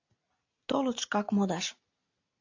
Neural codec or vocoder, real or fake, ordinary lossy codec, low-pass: none; real; AAC, 48 kbps; 7.2 kHz